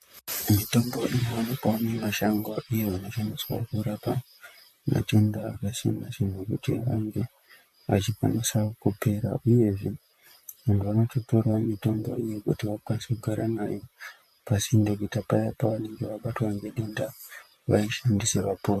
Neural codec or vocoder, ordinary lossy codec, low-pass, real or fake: vocoder, 44.1 kHz, 128 mel bands, Pupu-Vocoder; MP3, 64 kbps; 14.4 kHz; fake